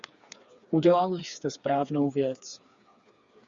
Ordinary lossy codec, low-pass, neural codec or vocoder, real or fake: Opus, 64 kbps; 7.2 kHz; codec, 16 kHz, 4 kbps, FreqCodec, smaller model; fake